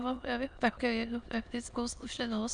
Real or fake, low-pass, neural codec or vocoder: fake; 9.9 kHz; autoencoder, 22.05 kHz, a latent of 192 numbers a frame, VITS, trained on many speakers